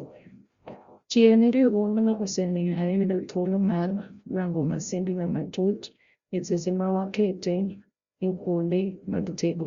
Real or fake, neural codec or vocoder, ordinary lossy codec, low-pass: fake; codec, 16 kHz, 0.5 kbps, FreqCodec, larger model; Opus, 64 kbps; 7.2 kHz